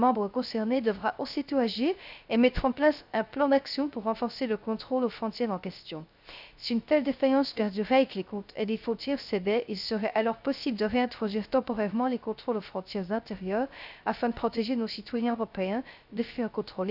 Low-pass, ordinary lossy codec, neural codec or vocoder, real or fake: 5.4 kHz; none; codec, 16 kHz, 0.3 kbps, FocalCodec; fake